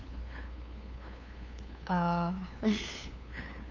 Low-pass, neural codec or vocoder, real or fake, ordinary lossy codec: 7.2 kHz; codec, 16 kHz, 2 kbps, FunCodec, trained on LibriTTS, 25 frames a second; fake; none